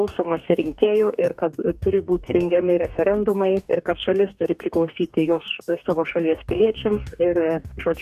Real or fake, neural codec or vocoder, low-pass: fake; codec, 44.1 kHz, 2.6 kbps, DAC; 14.4 kHz